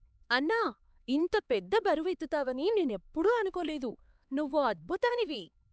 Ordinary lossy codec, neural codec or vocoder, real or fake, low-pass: none; codec, 16 kHz, 4 kbps, X-Codec, HuBERT features, trained on LibriSpeech; fake; none